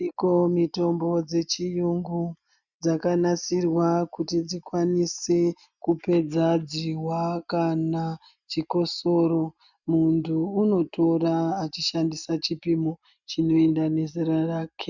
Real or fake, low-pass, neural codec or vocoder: real; 7.2 kHz; none